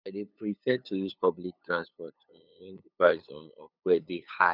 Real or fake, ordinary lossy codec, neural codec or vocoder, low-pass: fake; none; codec, 16 kHz, 8 kbps, FunCodec, trained on LibriTTS, 25 frames a second; 5.4 kHz